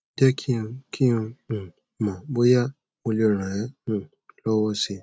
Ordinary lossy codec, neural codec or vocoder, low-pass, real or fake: none; none; none; real